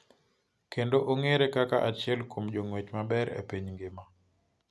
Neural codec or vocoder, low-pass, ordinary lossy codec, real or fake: none; none; none; real